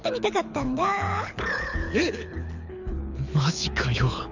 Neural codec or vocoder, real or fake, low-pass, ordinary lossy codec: codec, 24 kHz, 6 kbps, HILCodec; fake; 7.2 kHz; none